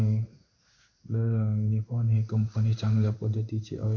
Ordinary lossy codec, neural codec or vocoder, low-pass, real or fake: none; codec, 16 kHz in and 24 kHz out, 1 kbps, XY-Tokenizer; 7.2 kHz; fake